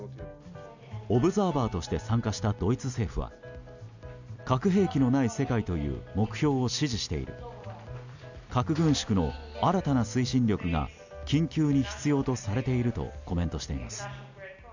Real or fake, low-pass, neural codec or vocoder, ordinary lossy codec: real; 7.2 kHz; none; none